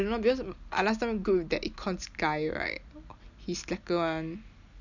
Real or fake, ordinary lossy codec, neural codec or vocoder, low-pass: real; none; none; 7.2 kHz